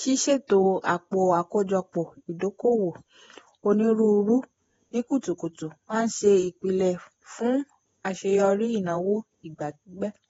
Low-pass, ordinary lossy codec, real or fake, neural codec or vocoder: 19.8 kHz; AAC, 24 kbps; fake; vocoder, 48 kHz, 128 mel bands, Vocos